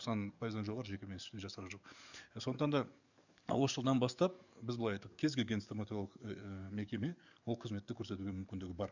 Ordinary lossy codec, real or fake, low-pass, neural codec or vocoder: none; fake; 7.2 kHz; codec, 44.1 kHz, 7.8 kbps, DAC